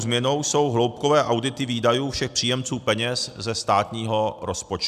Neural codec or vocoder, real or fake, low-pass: none; real; 14.4 kHz